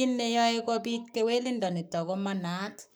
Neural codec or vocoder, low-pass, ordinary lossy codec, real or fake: codec, 44.1 kHz, 7.8 kbps, Pupu-Codec; none; none; fake